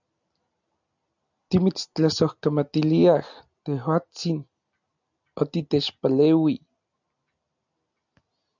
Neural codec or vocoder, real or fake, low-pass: none; real; 7.2 kHz